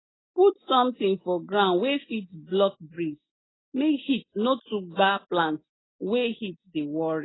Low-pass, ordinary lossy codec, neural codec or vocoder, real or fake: 7.2 kHz; AAC, 16 kbps; none; real